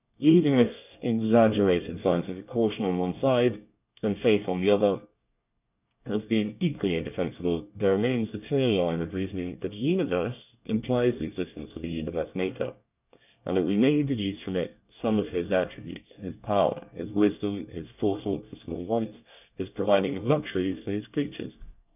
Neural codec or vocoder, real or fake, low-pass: codec, 24 kHz, 1 kbps, SNAC; fake; 3.6 kHz